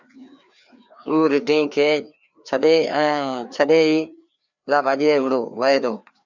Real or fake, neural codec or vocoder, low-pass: fake; codec, 16 kHz, 2 kbps, FreqCodec, larger model; 7.2 kHz